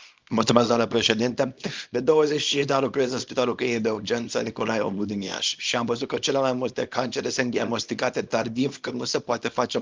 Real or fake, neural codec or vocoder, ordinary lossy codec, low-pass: fake; codec, 24 kHz, 0.9 kbps, WavTokenizer, small release; Opus, 32 kbps; 7.2 kHz